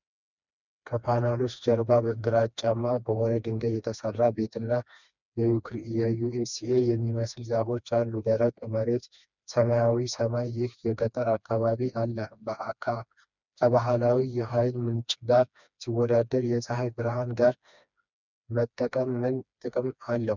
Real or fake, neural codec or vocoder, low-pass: fake; codec, 16 kHz, 2 kbps, FreqCodec, smaller model; 7.2 kHz